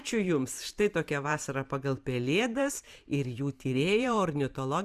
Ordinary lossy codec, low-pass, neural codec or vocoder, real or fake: Opus, 64 kbps; 14.4 kHz; vocoder, 44.1 kHz, 128 mel bands every 512 samples, BigVGAN v2; fake